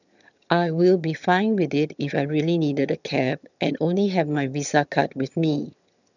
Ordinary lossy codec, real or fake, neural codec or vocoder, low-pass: none; fake; vocoder, 22.05 kHz, 80 mel bands, HiFi-GAN; 7.2 kHz